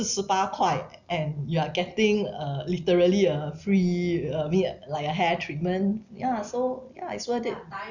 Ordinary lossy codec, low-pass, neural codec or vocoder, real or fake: none; 7.2 kHz; none; real